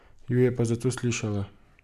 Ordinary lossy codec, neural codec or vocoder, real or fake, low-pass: none; none; real; 14.4 kHz